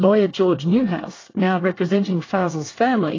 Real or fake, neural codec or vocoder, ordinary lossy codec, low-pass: fake; codec, 24 kHz, 1 kbps, SNAC; AAC, 32 kbps; 7.2 kHz